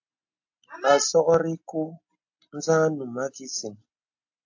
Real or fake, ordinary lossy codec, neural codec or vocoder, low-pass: real; AAC, 48 kbps; none; 7.2 kHz